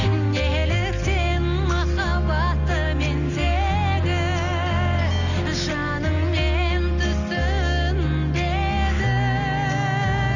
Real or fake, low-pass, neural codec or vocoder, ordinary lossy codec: real; 7.2 kHz; none; none